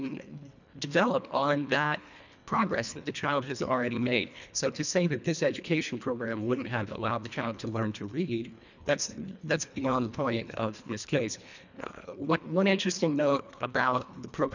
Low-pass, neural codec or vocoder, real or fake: 7.2 kHz; codec, 24 kHz, 1.5 kbps, HILCodec; fake